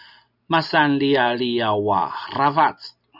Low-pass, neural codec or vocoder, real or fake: 5.4 kHz; none; real